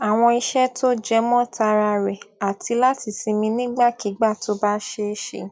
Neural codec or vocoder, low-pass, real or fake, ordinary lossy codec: none; none; real; none